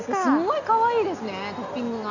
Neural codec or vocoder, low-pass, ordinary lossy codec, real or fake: none; 7.2 kHz; none; real